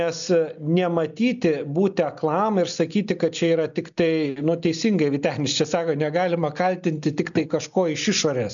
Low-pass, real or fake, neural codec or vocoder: 7.2 kHz; real; none